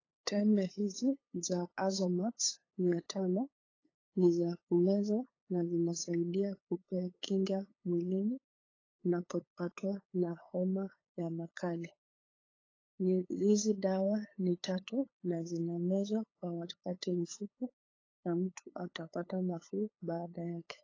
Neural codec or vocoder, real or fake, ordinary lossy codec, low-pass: codec, 16 kHz, 8 kbps, FunCodec, trained on LibriTTS, 25 frames a second; fake; AAC, 32 kbps; 7.2 kHz